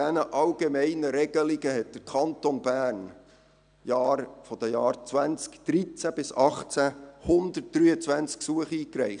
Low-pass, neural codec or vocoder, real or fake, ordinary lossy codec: 9.9 kHz; none; real; none